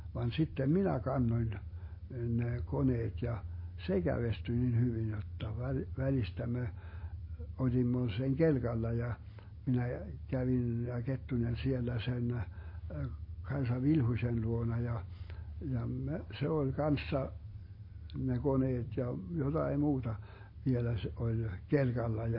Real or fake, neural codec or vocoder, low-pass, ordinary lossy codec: real; none; 5.4 kHz; MP3, 24 kbps